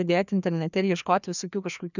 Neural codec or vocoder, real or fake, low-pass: codec, 16 kHz, 2 kbps, FreqCodec, larger model; fake; 7.2 kHz